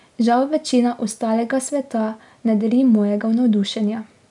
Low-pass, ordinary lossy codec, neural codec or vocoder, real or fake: 10.8 kHz; none; none; real